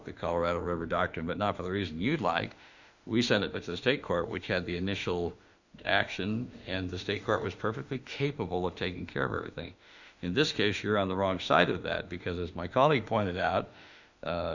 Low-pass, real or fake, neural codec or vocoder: 7.2 kHz; fake; autoencoder, 48 kHz, 32 numbers a frame, DAC-VAE, trained on Japanese speech